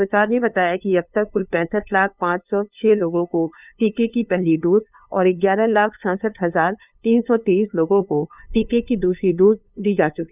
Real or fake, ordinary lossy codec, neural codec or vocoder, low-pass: fake; none; codec, 16 kHz, 2 kbps, FunCodec, trained on LibriTTS, 25 frames a second; 3.6 kHz